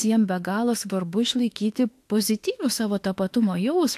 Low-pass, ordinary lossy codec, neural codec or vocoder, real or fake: 14.4 kHz; AAC, 64 kbps; autoencoder, 48 kHz, 32 numbers a frame, DAC-VAE, trained on Japanese speech; fake